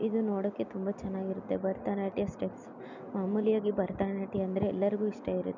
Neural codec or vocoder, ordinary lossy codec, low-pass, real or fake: none; none; 7.2 kHz; real